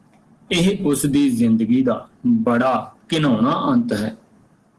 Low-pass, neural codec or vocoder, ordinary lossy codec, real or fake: 10.8 kHz; none; Opus, 16 kbps; real